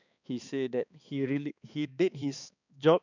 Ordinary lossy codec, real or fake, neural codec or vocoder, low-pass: none; fake; codec, 16 kHz, 2 kbps, X-Codec, HuBERT features, trained on balanced general audio; 7.2 kHz